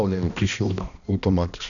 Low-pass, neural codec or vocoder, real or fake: 7.2 kHz; codec, 16 kHz, 1 kbps, X-Codec, HuBERT features, trained on balanced general audio; fake